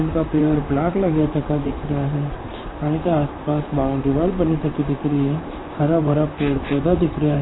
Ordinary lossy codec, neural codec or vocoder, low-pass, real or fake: AAC, 16 kbps; vocoder, 44.1 kHz, 128 mel bands, Pupu-Vocoder; 7.2 kHz; fake